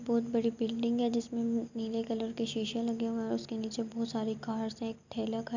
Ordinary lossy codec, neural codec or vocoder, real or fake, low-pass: none; none; real; 7.2 kHz